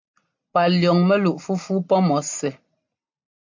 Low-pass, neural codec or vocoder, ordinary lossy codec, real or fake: 7.2 kHz; none; MP3, 48 kbps; real